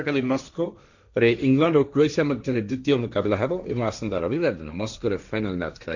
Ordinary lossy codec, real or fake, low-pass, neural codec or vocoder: none; fake; 7.2 kHz; codec, 16 kHz, 1.1 kbps, Voila-Tokenizer